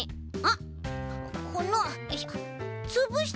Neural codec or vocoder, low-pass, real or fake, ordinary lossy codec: none; none; real; none